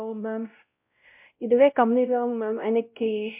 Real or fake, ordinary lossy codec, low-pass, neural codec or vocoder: fake; MP3, 32 kbps; 3.6 kHz; codec, 16 kHz, 0.5 kbps, X-Codec, WavLM features, trained on Multilingual LibriSpeech